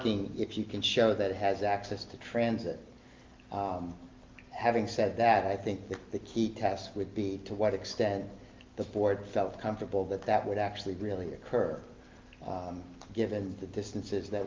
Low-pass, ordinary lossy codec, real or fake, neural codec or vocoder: 7.2 kHz; Opus, 24 kbps; real; none